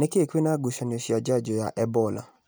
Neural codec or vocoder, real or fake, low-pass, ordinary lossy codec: none; real; none; none